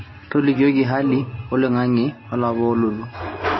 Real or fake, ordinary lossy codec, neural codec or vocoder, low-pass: real; MP3, 24 kbps; none; 7.2 kHz